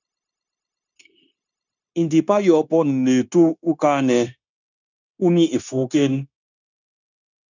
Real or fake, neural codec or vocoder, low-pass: fake; codec, 16 kHz, 0.9 kbps, LongCat-Audio-Codec; 7.2 kHz